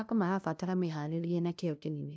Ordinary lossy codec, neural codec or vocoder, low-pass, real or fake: none; codec, 16 kHz, 0.5 kbps, FunCodec, trained on LibriTTS, 25 frames a second; none; fake